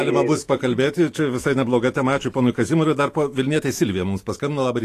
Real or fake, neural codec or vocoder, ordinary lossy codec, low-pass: fake; autoencoder, 48 kHz, 128 numbers a frame, DAC-VAE, trained on Japanese speech; AAC, 48 kbps; 14.4 kHz